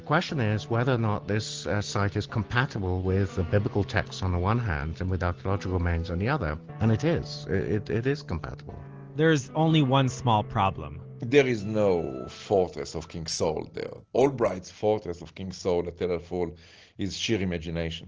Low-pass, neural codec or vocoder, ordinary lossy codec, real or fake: 7.2 kHz; none; Opus, 16 kbps; real